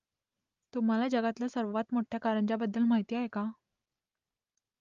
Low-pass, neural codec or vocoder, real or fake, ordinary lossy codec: 7.2 kHz; none; real; Opus, 32 kbps